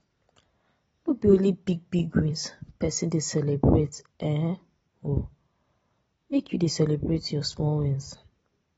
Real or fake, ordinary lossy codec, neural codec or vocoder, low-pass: real; AAC, 24 kbps; none; 10.8 kHz